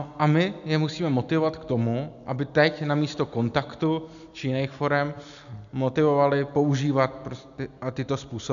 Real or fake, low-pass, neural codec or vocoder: real; 7.2 kHz; none